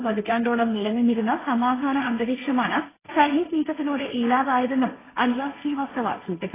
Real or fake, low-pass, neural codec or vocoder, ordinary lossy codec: fake; 3.6 kHz; codec, 16 kHz, 1.1 kbps, Voila-Tokenizer; AAC, 16 kbps